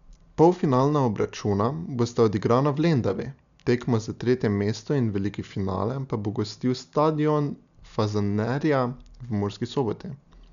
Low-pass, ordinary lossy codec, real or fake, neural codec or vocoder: 7.2 kHz; none; real; none